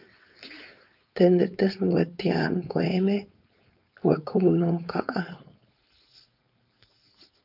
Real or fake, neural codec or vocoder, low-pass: fake; codec, 16 kHz, 4.8 kbps, FACodec; 5.4 kHz